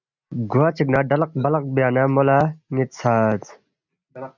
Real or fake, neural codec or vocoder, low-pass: real; none; 7.2 kHz